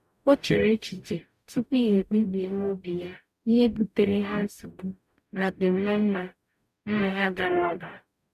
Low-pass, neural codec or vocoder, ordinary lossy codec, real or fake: 14.4 kHz; codec, 44.1 kHz, 0.9 kbps, DAC; none; fake